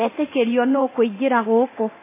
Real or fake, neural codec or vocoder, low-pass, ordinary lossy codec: fake; codec, 24 kHz, 0.9 kbps, DualCodec; 3.6 kHz; MP3, 16 kbps